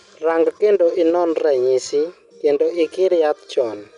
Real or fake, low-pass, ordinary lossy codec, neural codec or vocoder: real; 10.8 kHz; none; none